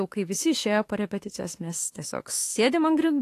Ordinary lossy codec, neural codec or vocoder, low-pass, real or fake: AAC, 48 kbps; autoencoder, 48 kHz, 32 numbers a frame, DAC-VAE, trained on Japanese speech; 14.4 kHz; fake